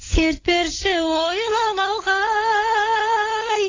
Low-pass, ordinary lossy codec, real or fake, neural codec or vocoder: 7.2 kHz; AAC, 32 kbps; fake; codec, 16 kHz, 16 kbps, FunCodec, trained on LibriTTS, 50 frames a second